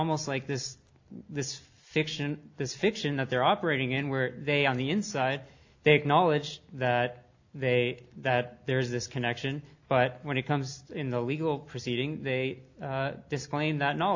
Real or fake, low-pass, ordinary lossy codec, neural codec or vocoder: real; 7.2 kHz; AAC, 48 kbps; none